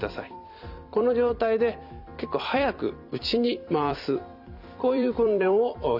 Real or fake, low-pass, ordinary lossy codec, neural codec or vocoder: fake; 5.4 kHz; none; vocoder, 44.1 kHz, 128 mel bands every 256 samples, BigVGAN v2